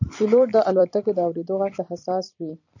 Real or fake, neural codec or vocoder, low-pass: fake; vocoder, 44.1 kHz, 128 mel bands every 256 samples, BigVGAN v2; 7.2 kHz